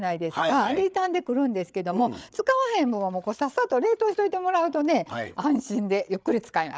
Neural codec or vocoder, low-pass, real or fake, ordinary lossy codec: codec, 16 kHz, 8 kbps, FreqCodec, larger model; none; fake; none